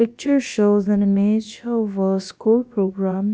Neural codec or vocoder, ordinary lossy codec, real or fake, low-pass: codec, 16 kHz, about 1 kbps, DyCAST, with the encoder's durations; none; fake; none